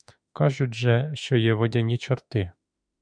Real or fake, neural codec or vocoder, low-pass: fake; autoencoder, 48 kHz, 32 numbers a frame, DAC-VAE, trained on Japanese speech; 9.9 kHz